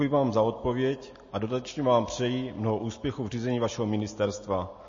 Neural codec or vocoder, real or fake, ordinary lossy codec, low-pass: none; real; MP3, 32 kbps; 7.2 kHz